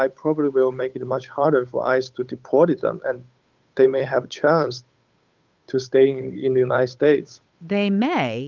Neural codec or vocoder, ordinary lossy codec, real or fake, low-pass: codec, 16 kHz, 8 kbps, FunCodec, trained on Chinese and English, 25 frames a second; Opus, 24 kbps; fake; 7.2 kHz